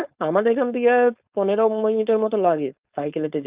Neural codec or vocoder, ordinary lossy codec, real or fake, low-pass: codec, 16 kHz, 4.8 kbps, FACodec; Opus, 24 kbps; fake; 3.6 kHz